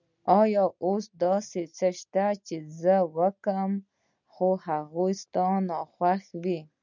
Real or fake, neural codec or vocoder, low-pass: real; none; 7.2 kHz